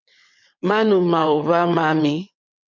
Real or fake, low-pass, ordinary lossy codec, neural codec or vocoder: fake; 7.2 kHz; MP3, 64 kbps; vocoder, 22.05 kHz, 80 mel bands, WaveNeXt